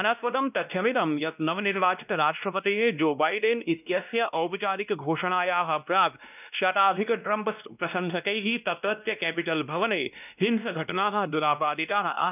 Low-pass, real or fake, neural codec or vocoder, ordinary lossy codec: 3.6 kHz; fake; codec, 16 kHz, 1 kbps, X-Codec, WavLM features, trained on Multilingual LibriSpeech; none